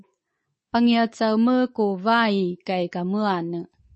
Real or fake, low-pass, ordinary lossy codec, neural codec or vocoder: fake; 10.8 kHz; MP3, 32 kbps; codec, 24 kHz, 3.1 kbps, DualCodec